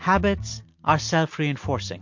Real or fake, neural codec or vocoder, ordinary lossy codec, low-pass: real; none; MP3, 48 kbps; 7.2 kHz